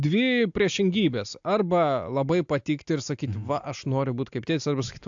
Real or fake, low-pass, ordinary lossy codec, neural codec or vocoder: real; 7.2 kHz; AAC, 64 kbps; none